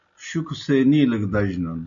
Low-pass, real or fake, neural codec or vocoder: 7.2 kHz; real; none